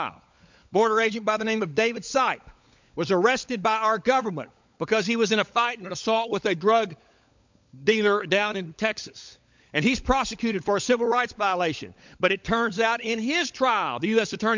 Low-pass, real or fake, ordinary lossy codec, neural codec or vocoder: 7.2 kHz; fake; MP3, 64 kbps; codec, 16 kHz, 16 kbps, FunCodec, trained on LibriTTS, 50 frames a second